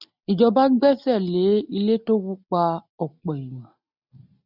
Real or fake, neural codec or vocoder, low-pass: real; none; 5.4 kHz